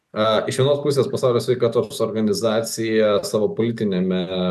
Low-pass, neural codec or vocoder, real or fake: 14.4 kHz; none; real